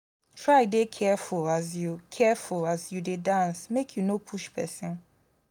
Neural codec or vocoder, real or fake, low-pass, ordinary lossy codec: none; real; none; none